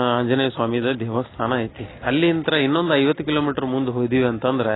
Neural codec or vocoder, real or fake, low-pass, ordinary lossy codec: none; real; 7.2 kHz; AAC, 16 kbps